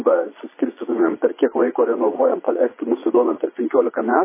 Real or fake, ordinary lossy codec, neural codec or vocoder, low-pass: fake; MP3, 16 kbps; vocoder, 22.05 kHz, 80 mel bands, Vocos; 3.6 kHz